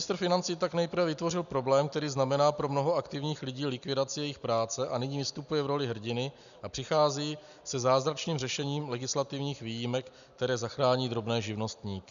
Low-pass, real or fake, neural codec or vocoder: 7.2 kHz; real; none